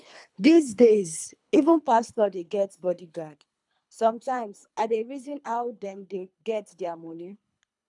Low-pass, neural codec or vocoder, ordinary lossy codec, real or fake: 10.8 kHz; codec, 24 kHz, 3 kbps, HILCodec; none; fake